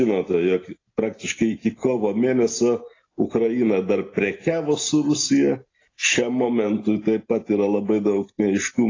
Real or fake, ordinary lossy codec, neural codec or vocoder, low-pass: real; AAC, 32 kbps; none; 7.2 kHz